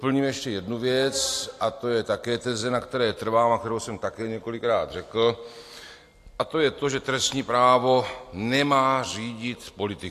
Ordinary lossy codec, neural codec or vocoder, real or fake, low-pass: AAC, 64 kbps; none; real; 14.4 kHz